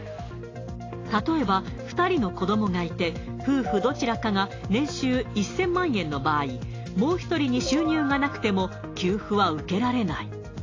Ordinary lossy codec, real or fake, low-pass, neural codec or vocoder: AAC, 32 kbps; real; 7.2 kHz; none